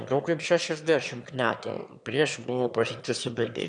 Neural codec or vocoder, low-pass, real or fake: autoencoder, 22.05 kHz, a latent of 192 numbers a frame, VITS, trained on one speaker; 9.9 kHz; fake